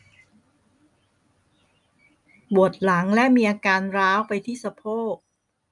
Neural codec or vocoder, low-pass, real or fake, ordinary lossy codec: none; 10.8 kHz; real; none